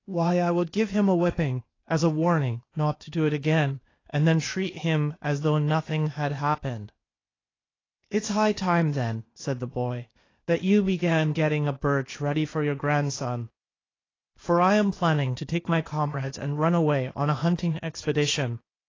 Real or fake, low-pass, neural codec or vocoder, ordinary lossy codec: fake; 7.2 kHz; codec, 16 kHz, 0.8 kbps, ZipCodec; AAC, 32 kbps